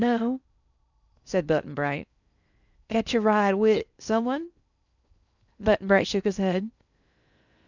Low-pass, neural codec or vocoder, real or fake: 7.2 kHz; codec, 16 kHz in and 24 kHz out, 0.6 kbps, FocalCodec, streaming, 2048 codes; fake